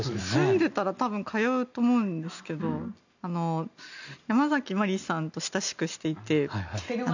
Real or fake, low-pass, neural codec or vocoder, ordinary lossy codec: real; 7.2 kHz; none; none